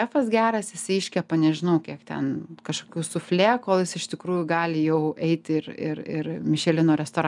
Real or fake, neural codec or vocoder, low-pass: real; none; 10.8 kHz